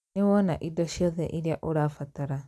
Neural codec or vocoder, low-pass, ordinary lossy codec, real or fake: none; none; none; real